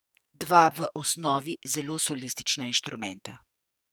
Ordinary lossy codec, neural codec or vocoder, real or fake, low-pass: none; codec, 44.1 kHz, 2.6 kbps, SNAC; fake; none